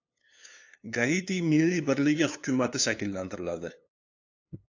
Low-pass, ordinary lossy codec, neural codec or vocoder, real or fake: 7.2 kHz; AAC, 48 kbps; codec, 16 kHz, 2 kbps, FunCodec, trained on LibriTTS, 25 frames a second; fake